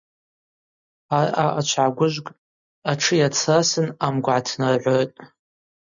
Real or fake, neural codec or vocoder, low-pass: real; none; 7.2 kHz